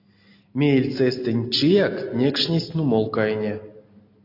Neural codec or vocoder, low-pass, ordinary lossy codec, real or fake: none; 5.4 kHz; AAC, 32 kbps; real